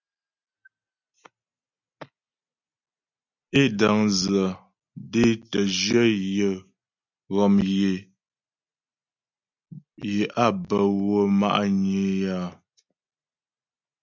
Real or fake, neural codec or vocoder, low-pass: real; none; 7.2 kHz